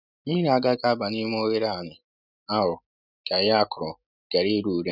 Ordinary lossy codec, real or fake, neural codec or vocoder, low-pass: none; real; none; 5.4 kHz